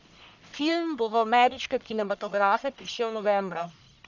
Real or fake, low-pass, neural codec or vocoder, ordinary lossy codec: fake; 7.2 kHz; codec, 44.1 kHz, 1.7 kbps, Pupu-Codec; none